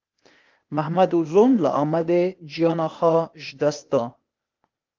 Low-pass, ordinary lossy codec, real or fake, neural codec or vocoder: 7.2 kHz; Opus, 24 kbps; fake; codec, 16 kHz, 0.8 kbps, ZipCodec